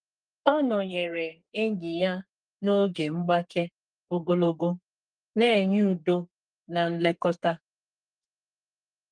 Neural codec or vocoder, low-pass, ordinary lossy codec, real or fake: codec, 32 kHz, 1.9 kbps, SNAC; 9.9 kHz; Opus, 32 kbps; fake